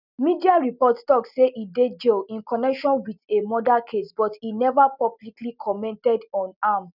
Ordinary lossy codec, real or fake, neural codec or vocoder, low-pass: none; real; none; 5.4 kHz